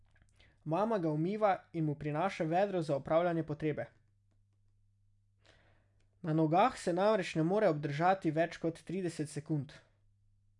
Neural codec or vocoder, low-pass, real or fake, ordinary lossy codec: none; 10.8 kHz; real; none